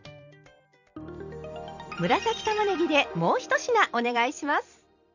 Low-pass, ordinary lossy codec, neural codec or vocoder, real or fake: 7.2 kHz; none; vocoder, 44.1 kHz, 128 mel bands every 256 samples, BigVGAN v2; fake